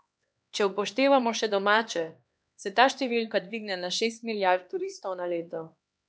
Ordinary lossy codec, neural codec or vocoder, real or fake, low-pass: none; codec, 16 kHz, 2 kbps, X-Codec, HuBERT features, trained on LibriSpeech; fake; none